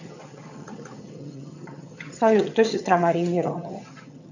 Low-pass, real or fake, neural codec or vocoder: 7.2 kHz; fake; vocoder, 22.05 kHz, 80 mel bands, HiFi-GAN